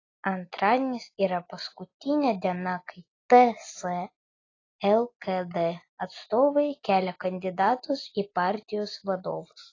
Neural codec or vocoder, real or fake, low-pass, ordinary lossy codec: none; real; 7.2 kHz; AAC, 32 kbps